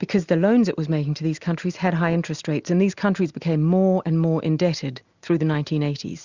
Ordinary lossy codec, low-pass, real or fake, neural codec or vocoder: Opus, 64 kbps; 7.2 kHz; real; none